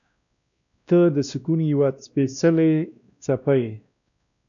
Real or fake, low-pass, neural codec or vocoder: fake; 7.2 kHz; codec, 16 kHz, 1 kbps, X-Codec, WavLM features, trained on Multilingual LibriSpeech